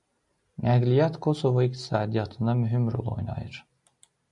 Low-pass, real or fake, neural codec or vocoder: 10.8 kHz; real; none